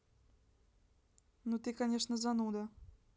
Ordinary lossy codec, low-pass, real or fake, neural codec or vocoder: none; none; real; none